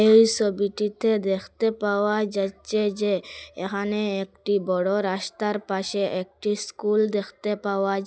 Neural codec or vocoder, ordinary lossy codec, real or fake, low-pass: none; none; real; none